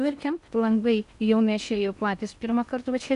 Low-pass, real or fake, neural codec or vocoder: 10.8 kHz; fake; codec, 16 kHz in and 24 kHz out, 0.8 kbps, FocalCodec, streaming, 65536 codes